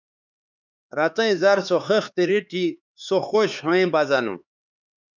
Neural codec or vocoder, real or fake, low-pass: codec, 16 kHz, 4 kbps, X-Codec, HuBERT features, trained on LibriSpeech; fake; 7.2 kHz